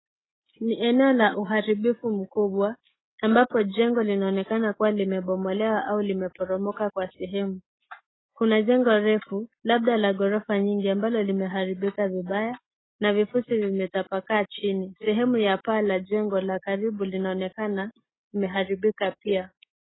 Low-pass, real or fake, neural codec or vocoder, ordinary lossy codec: 7.2 kHz; real; none; AAC, 16 kbps